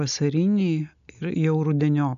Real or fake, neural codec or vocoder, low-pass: real; none; 7.2 kHz